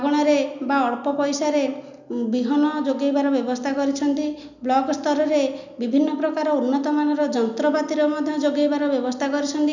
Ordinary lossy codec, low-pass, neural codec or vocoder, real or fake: none; 7.2 kHz; none; real